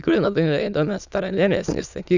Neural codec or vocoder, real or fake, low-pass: autoencoder, 22.05 kHz, a latent of 192 numbers a frame, VITS, trained on many speakers; fake; 7.2 kHz